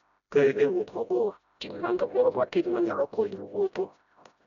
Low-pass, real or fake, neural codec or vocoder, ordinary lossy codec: 7.2 kHz; fake; codec, 16 kHz, 0.5 kbps, FreqCodec, smaller model; none